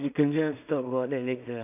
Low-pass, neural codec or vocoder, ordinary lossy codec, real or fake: 3.6 kHz; codec, 16 kHz in and 24 kHz out, 0.4 kbps, LongCat-Audio-Codec, two codebook decoder; none; fake